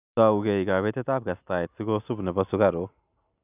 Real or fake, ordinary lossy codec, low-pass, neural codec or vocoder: real; none; 3.6 kHz; none